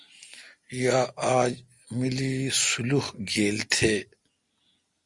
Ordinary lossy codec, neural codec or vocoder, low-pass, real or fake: Opus, 64 kbps; none; 10.8 kHz; real